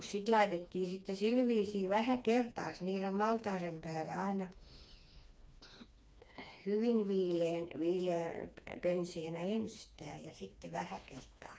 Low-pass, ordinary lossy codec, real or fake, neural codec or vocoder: none; none; fake; codec, 16 kHz, 2 kbps, FreqCodec, smaller model